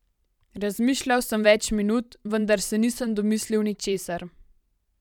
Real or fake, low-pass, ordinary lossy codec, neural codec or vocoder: fake; 19.8 kHz; none; vocoder, 44.1 kHz, 128 mel bands every 512 samples, BigVGAN v2